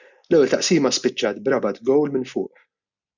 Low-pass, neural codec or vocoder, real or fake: 7.2 kHz; none; real